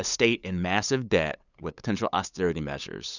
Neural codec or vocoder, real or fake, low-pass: codec, 16 kHz, 8 kbps, FunCodec, trained on LibriTTS, 25 frames a second; fake; 7.2 kHz